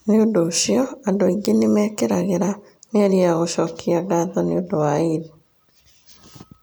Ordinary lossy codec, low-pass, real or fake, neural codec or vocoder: none; none; real; none